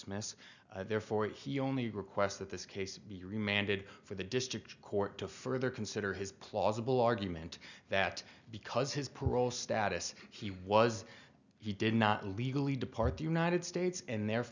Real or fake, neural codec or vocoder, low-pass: real; none; 7.2 kHz